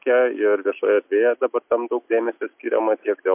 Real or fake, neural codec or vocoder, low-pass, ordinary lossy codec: real; none; 3.6 kHz; MP3, 32 kbps